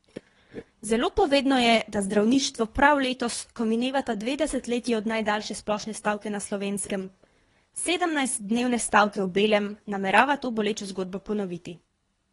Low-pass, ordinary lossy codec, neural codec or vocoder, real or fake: 10.8 kHz; AAC, 32 kbps; codec, 24 kHz, 3 kbps, HILCodec; fake